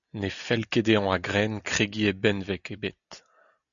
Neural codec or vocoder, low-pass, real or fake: none; 7.2 kHz; real